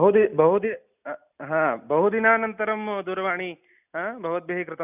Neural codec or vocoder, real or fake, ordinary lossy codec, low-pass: none; real; none; 3.6 kHz